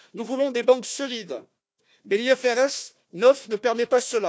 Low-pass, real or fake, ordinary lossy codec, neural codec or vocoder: none; fake; none; codec, 16 kHz, 1 kbps, FunCodec, trained on Chinese and English, 50 frames a second